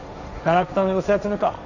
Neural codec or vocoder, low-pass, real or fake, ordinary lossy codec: codec, 16 kHz, 1.1 kbps, Voila-Tokenizer; 7.2 kHz; fake; none